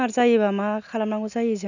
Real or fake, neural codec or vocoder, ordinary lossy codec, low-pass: real; none; none; 7.2 kHz